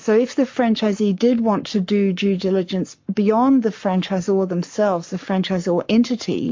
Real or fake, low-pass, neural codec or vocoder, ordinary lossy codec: fake; 7.2 kHz; codec, 44.1 kHz, 7.8 kbps, Pupu-Codec; MP3, 48 kbps